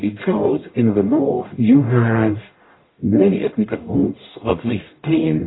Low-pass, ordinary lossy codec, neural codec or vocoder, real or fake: 7.2 kHz; AAC, 16 kbps; codec, 44.1 kHz, 0.9 kbps, DAC; fake